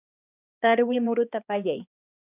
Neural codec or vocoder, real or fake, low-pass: codec, 16 kHz, 2 kbps, X-Codec, HuBERT features, trained on balanced general audio; fake; 3.6 kHz